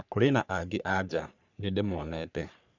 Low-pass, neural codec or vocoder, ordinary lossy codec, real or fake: 7.2 kHz; codec, 44.1 kHz, 3.4 kbps, Pupu-Codec; none; fake